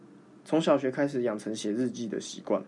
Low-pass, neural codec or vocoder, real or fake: 10.8 kHz; none; real